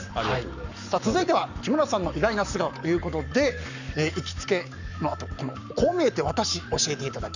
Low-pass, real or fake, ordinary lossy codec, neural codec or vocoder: 7.2 kHz; fake; none; codec, 44.1 kHz, 7.8 kbps, Pupu-Codec